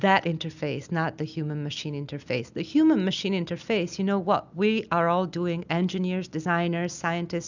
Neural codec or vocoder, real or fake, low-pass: none; real; 7.2 kHz